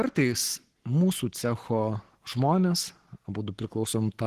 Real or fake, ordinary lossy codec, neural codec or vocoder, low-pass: fake; Opus, 16 kbps; codec, 44.1 kHz, 7.8 kbps, Pupu-Codec; 14.4 kHz